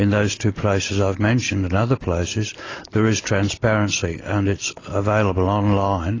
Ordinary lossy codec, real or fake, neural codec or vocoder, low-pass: AAC, 32 kbps; real; none; 7.2 kHz